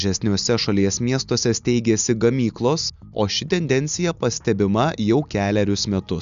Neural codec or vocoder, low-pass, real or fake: none; 7.2 kHz; real